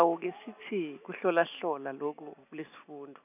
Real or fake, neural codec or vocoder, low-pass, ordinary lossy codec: real; none; 3.6 kHz; none